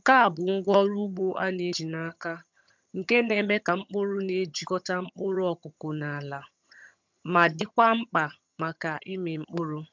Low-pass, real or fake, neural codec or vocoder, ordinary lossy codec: 7.2 kHz; fake; vocoder, 22.05 kHz, 80 mel bands, HiFi-GAN; MP3, 64 kbps